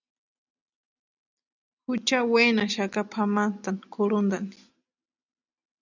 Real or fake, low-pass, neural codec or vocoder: real; 7.2 kHz; none